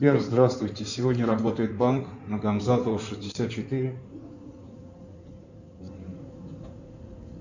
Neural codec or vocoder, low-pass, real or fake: codec, 16 kHz in and 24 kHz out, 2.2 kbps, FireRedTTS-2 codec; 7.2 kHz; fake